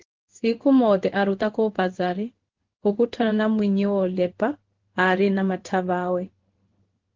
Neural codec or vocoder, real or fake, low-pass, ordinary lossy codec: codec, 16 kHz in and 24 kHz out, 1 kbps, XY-Tokenizer; fake; 7.2 kHz; Opus, 16 kbps